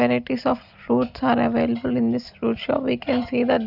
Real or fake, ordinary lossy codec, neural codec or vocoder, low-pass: real; none; none; 5.4 kHz